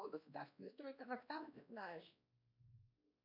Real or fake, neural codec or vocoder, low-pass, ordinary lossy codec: fake; codec, 16 kHz, 1 kbps, X-Codec, WavLM features, trained on Multilingual LibriSpeech; 5.4 kHz; MP3, 48 kbps